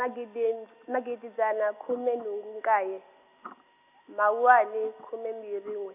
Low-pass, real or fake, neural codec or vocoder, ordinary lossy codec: 3.6 kHz; real; none; AAC, 32 kbps